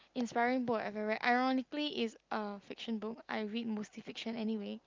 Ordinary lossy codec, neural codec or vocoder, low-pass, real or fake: Opus, 24 kbps; none; 7.2 kHz; real